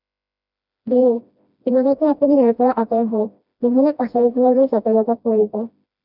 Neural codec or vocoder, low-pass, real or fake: codec, 16 kHz, 1 kbps, FreqCodec, smaller model; 5.4 kHz; fake